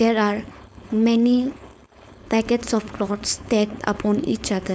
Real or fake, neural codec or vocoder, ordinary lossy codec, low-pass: fake; codec, 16 kHz, 4.8 kbps, FACodec; none; none